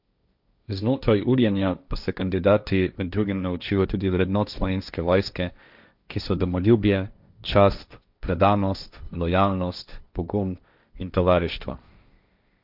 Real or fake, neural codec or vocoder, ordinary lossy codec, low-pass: fake; codec, 16 kHz, 1.1 kbps, Voila-Tokenizer; none; 5.4 kHz